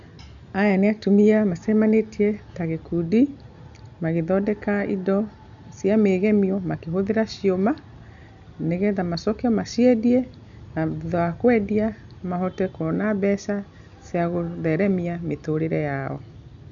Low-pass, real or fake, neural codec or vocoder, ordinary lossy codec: 7.2 kHz; real; none; none